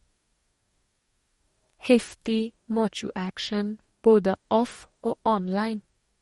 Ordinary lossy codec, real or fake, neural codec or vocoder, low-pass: MP3, 48 kbps; fake; codec, 44.1 kHz, 2.6 kbps, DAC; 19.8 kHz